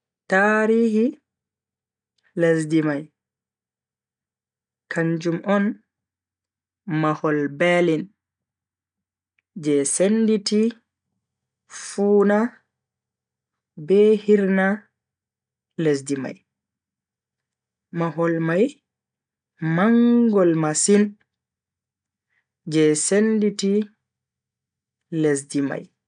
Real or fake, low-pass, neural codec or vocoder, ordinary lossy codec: real; 9.9 kHz; none; none